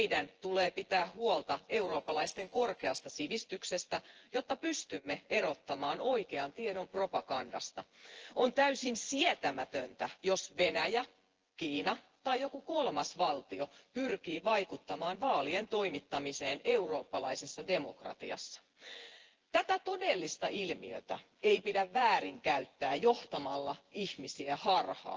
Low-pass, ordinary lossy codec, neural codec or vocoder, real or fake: 7.2 kHz; Opus, 16 kbps; vocoder, 24 kHz, 100 mel bands, Vocos; fake